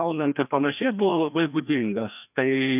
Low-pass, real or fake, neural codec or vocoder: 3.6 kHz; fake; codec, 16 kHz, 1 kbps, FreqCodec, larger model